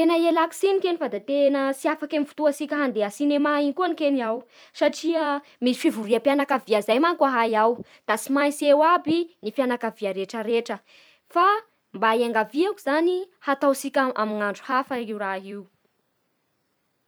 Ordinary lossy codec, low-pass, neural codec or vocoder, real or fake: none; none; vocoder, 44.1 kHz, 128 mel bands every 256 samples, BigVGAN v2; fake